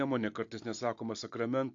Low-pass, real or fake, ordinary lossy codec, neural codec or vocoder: 7.2 kHz; real; AAC, 48 kbps; none